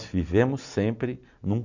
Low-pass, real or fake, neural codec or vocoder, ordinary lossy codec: 7.2 kHz; real; none; none